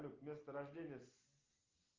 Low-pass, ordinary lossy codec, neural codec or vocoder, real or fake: 7.2 kHz; Opus, 32 kbps; none; real